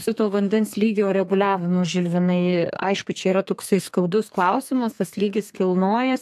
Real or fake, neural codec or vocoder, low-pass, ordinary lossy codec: fake; codec, 32 kHz, 1.9 kbps, SNAC; 14.4 kHz; AAC, 96 kbps